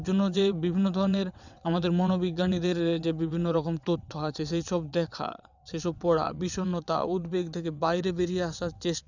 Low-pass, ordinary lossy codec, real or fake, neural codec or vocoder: 7.2 kHz; none; fake; vocoder, 22.05 kHz, 80 mel bands, WaveNeXt